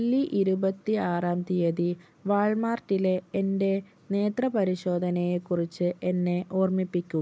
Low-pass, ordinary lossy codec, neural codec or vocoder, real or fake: none; none; none; real